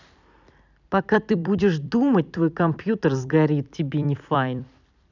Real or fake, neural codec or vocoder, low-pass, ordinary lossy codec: fake; vocoder, 44.1 kHz, 128 mel bands every 256 samples, BigVGAN v2; 7.2 kHz; none